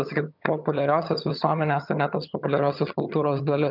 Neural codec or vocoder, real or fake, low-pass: vocoder, 22.05 kHz, 80 mel bands, HiFi-GAN; fake; 5.4 kHz